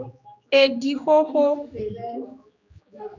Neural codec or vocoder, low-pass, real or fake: codec, 16 kHz, 2 kbps, X-Codec, HuBERT features, trained on general audio; 7.2 kHz; fake